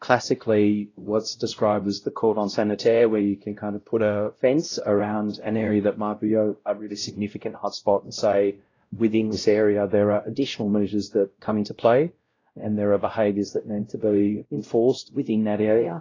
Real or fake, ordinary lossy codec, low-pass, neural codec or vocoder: fake; AAC, 32 kbps; 7.2 kHz; codec, 16 kHz, 0.5 kbps, X-Codec, WavLM features, trained on Multilingual LibriSpeech